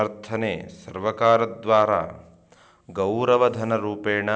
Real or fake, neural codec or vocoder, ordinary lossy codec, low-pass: real; none; none; none